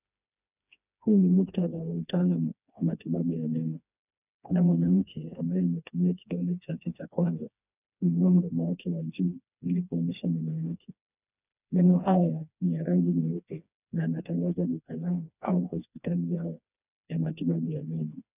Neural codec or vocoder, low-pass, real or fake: codec, 16 kHz, 2 kbps, FreqCodec, smaller model; 3.6 kHz; fake